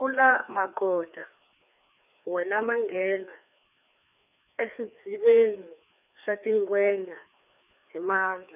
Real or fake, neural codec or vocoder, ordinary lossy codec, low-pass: fake; codec, 16 kHz, 2 kbps, FreqCodec, larger model; none; 3.6 kHz